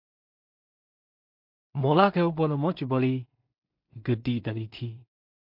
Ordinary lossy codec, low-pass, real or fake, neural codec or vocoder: MP3, 48 kbps; 5.4 kHz; fake; codec, 16 kHz in and 24 kHz out, 0.4 kbps, LongCat-Audio-Codec, two codebook decoder